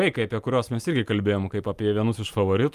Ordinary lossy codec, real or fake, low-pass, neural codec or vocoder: Opus, 32 kbps; real; 14.4 kHz; none